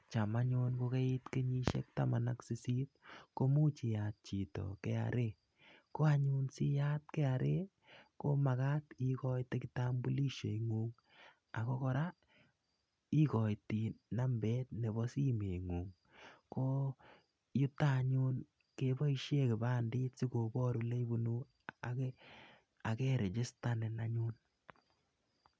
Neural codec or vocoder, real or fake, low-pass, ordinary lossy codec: none; real; none; none